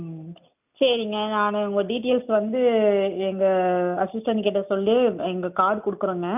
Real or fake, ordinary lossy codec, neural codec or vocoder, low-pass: real; none; none; 3.6 kHz